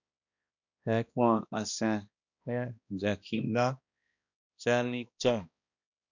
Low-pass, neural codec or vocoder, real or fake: 7.2 kHz; codec, 16 kHz, 1 kbps, X-Codec, HuBERT features, trained on balanced general audio; fake